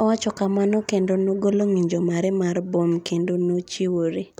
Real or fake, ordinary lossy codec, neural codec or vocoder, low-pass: real; none; none; 19.8 kHz